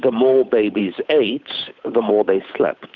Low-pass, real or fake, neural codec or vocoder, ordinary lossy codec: 7.2 kHz; fake; codec, 16 kHz, 8 kbps, FunCodec, trained on Chinese and English, 25 frames a second; Opus, 64 kbps